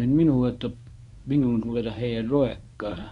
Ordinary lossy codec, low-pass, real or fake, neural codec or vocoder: none; 10.8 kHz; fake; codec, 24 kHz, 0.9 kbps, WavTokenizer, medium speech release version 1